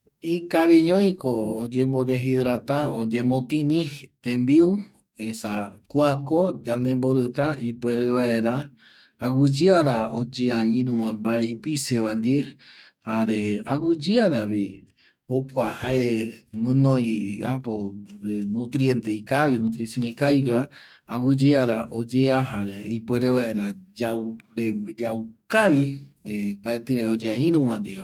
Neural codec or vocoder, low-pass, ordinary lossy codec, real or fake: codec, 44.1 kHz, 2.6 kbps, DAC; 19.8 kHz; none; fake